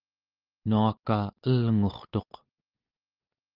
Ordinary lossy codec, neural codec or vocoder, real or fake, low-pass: Opus, 32 kbps; none; real; 5.4 kHz